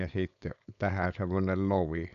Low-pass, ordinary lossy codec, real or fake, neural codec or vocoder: 7.2 kHz; none; fake; codec, 16 kHz, 4.8 kbps, FACodec